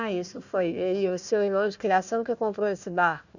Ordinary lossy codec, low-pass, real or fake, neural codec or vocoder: none; 7.2 kHz; fake; codec, 16 kHz, 1 kbps, FunCodec, trained on Chinese and English, 50 frames a second